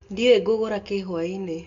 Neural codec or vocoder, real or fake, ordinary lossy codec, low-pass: none; real; MP3, 64 kbps; 7.2 kHz